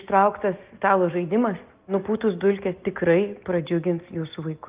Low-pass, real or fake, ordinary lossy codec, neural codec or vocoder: 3.6 kHz; real; Opus, 32 kbps; none